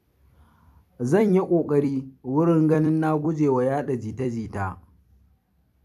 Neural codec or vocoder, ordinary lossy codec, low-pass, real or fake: vocoder, 44.1 kHz, 128 mel bands every 256 samples, BigVGAN v2; AAC, 96 kbps; 14.4 kHz; fake